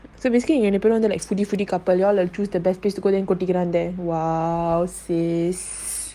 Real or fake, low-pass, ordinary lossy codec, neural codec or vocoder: real; 14.4 kHz; Opus, 32 kbps; none